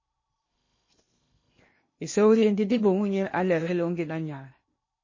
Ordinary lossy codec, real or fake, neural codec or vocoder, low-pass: MP3, 32 kbps; fake; codec, 16 kHz in and 24 kHz out, 0.8 kbps, FocalCodec, streaming, 65536 codes; 7.2 kHz